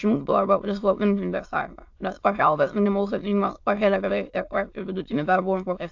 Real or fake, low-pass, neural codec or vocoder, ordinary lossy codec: fake; 7.2 kHz; autoencoder, 22.05 kHz, a latent of 192 numbers a frame, VITS, trained on many speakers; AAC, 48 kbps